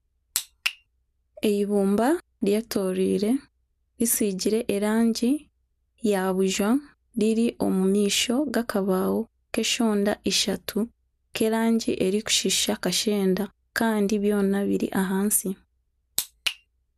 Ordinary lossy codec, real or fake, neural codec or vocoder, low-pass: none; real; none; 14.4 kHz